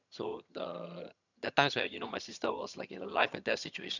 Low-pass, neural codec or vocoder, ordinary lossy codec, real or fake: 7.2 kHz; vocoder, 22.05 kHz, 80 mel bands, HiFi-GAN; none; fake